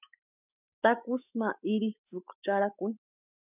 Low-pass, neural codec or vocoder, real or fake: 3.6 kHz; autoencoder, 48 kHz, 128 numbers a frame, DAC-VAE, trained on Japanese speech; fake